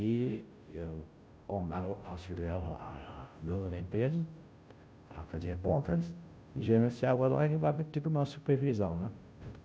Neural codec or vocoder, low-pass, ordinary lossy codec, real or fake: codec, 16 kHz, 0.5 kbps, FunCodec, trained on Chinese and English, 25 frames a second; none; none; fake